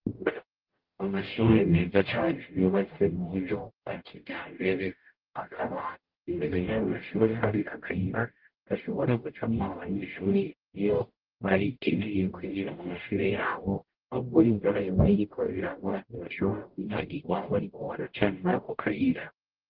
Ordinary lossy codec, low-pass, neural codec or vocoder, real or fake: Opus, 16 kbps; 5.4 kHz; codec, 44.1 kHz, 0.9 kbps, DAC; fake